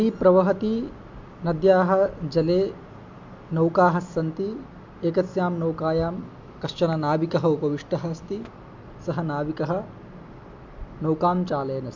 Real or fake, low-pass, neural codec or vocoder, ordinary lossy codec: real; 7.2 kHz; none; MP3, 48 kbps